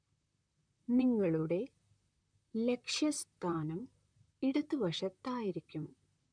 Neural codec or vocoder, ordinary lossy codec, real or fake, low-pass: vocoder, 44.1 kHz, 128 mel bands, Pupu-Vocoder; none; fake; 9.9 kHz